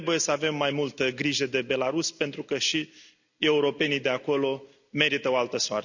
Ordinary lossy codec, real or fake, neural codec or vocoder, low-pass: none; real; none; 7.2 kHz